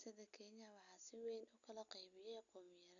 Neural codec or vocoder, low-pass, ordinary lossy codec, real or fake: none; 7.2 kHz; none; real